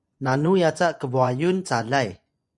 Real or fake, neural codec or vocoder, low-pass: fake; vocoder, 24 kHz, 100 mel bands, Vocos; 10.8 kHz